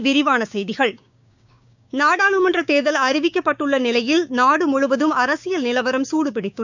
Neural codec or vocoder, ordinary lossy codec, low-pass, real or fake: codec, 16 kHz, 6 kbps, DAC; none; 7.2 kHz; fake